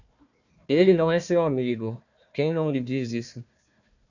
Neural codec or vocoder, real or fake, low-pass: codec, 16 kHz, 1 kbps, FunCodec, trained on Chinese and English, 50 frames a second; fake; 7.2 kHz